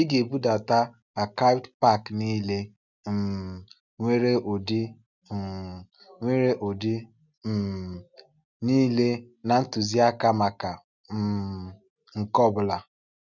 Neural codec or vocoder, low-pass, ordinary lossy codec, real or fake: none; 7.2 kHz; none; real